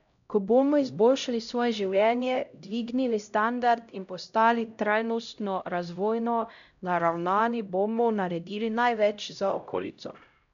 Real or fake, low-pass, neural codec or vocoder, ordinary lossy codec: fake; 7.2 kHz; codec, 16 kHz, 0.5 kbps, X-Codec, HuBERT features, trained on LibriSpeech; MP3, 96 kbps